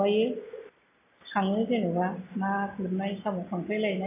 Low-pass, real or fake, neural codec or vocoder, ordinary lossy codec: 3.6 kHz; real; none; AAC, 24 kbps